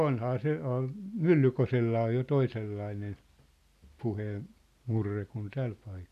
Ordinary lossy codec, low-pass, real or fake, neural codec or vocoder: none; 14.4 kHz; real; none